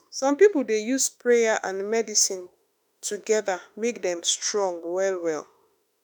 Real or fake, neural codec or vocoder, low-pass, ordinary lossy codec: fake; autoencoder, 48 kHz, 32 numbers a frame, DAC-VAE, trained on Japanese speech; none; none